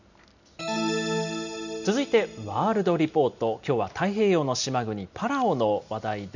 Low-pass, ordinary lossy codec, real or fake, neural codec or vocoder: 7.2 kHz; none; real; none